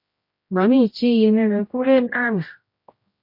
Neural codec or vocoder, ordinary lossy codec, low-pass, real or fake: codec, 16 kHz, 0.5 kbps, X-Codec, HuBERT features, trained on general audio; MP3, 32 kbps; 5.4 kHz; fake